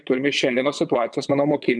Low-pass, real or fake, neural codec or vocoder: 9.9 kHz; fake; vocoder, 22.05 kHz, 80 mel bands, WaveNeXt